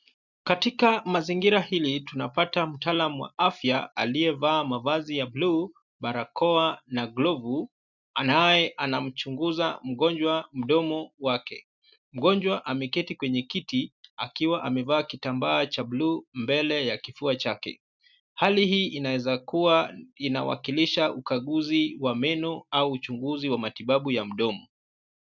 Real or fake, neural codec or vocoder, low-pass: real; none; 7.2 kHz